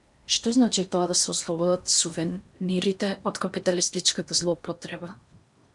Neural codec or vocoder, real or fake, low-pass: codec, 16 kHz in and 24 kHz out, 0.8 kbps, FocalCodec, streaming, 65536 codes; fake; 10.8 kHz